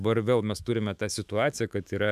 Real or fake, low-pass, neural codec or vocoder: fake; 14.4 kHz; autoencoder, 48 kHz, 128 numbers a frame, DAC-VAE, trained on Japanese speech